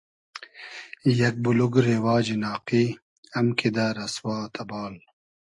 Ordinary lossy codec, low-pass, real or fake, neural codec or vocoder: AAC, 64 kbps; 10.8 kHz; real; none